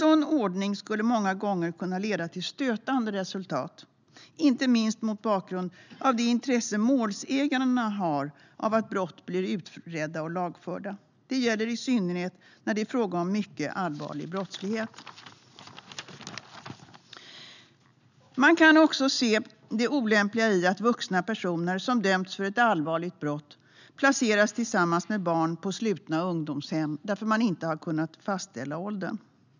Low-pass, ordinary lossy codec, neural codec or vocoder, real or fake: 7.2 kHz; none; none; real